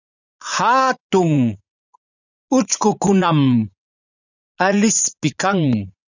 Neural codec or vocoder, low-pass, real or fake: vocoder, 44.1 kHz, 80 mel bands, Vocos; 7.2 kHz; fake